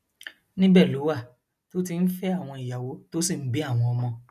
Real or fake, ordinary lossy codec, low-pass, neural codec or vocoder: real; none; 14.4 kHz; none